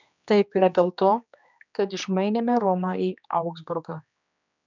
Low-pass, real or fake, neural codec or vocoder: 7.2 kHz; fake; codec, 16 kHz, 2 kbps, X-Codec, HuBERT features, trained on general audio